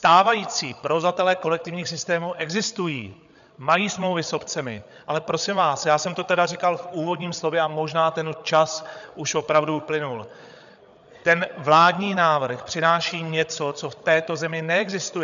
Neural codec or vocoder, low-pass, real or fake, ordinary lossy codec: codec, 16 kHz, 8 kbps, FreqCodec, larger model; 7.2 kHz; fake; MP3, 96 kbps